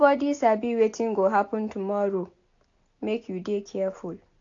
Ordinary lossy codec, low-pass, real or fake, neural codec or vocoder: AAC, 48 kbps; 7.2 kHz; real; none